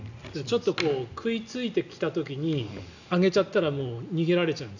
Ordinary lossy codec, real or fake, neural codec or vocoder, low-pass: none; real; none; 7.2 kHz